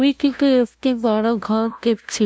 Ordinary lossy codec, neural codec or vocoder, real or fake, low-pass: none; codec, 16 kHz, 0.5 kbps, FunCodec, trained on LibriTTS, 25 frames a second; fake; none